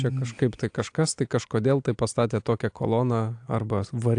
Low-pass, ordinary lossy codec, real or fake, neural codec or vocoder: 9.9 kHz; AAC, 64 kbps; real; none